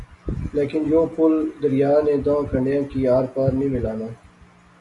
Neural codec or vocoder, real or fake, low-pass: none; real; 10.8 kHz